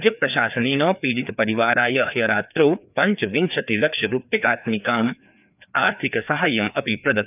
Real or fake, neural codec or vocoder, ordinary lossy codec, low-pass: fake; codec, 16 kHz, 2 kbps, FreqCodec, larger model; none; 3.6 kHz